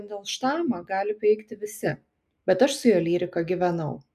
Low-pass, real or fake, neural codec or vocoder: 14.4 kHz; real; none